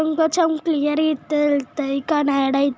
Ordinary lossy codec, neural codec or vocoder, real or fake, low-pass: none; none; real; none